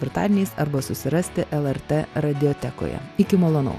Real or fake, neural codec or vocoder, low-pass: real; none; 14.4 kHz